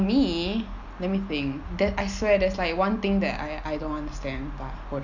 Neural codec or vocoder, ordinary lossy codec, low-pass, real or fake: none; none; 7.2 kHz; real